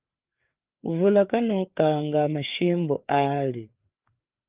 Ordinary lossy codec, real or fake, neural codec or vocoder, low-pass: Opus, 32 kbps; fake; codec, 16 kHz, 4 kbps, FreqCodec, larger model; 3.6 kHz